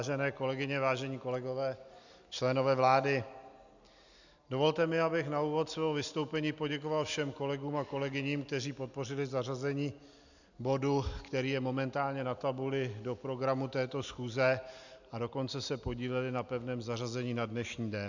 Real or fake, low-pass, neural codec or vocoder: real; 7.2 kHz; none